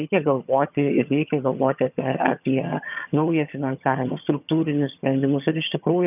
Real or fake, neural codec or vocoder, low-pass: fake; vocoder, 22.05 kHz, 80 mel bands, HiFi-GAN; 3.6 kHz